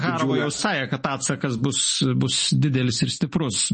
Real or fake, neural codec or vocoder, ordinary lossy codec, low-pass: real; none; MP3, 32 kbps; 10.8 kHz